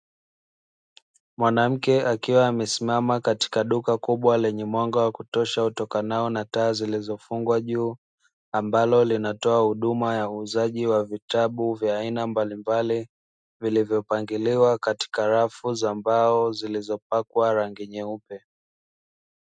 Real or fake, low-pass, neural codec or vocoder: real; 9.9 kHz; none